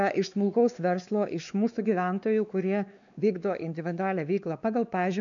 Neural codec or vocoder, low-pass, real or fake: codec, 16 kHz, 2 kbps, X-Codec, WavLM features, trained on Multilingual LibriSpeech; 7.2 kHz; fake